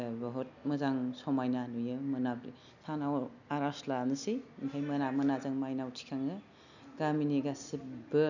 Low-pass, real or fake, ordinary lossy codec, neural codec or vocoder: 7.2 kHz; real; none; none